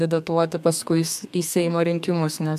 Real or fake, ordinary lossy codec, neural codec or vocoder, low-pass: fake; AAC, 96 kbps; codec, 32 kHz, 1.9 kbps, SNAC; 14.4 kHz